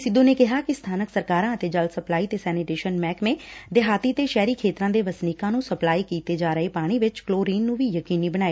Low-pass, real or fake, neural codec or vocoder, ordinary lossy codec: none; real; none; none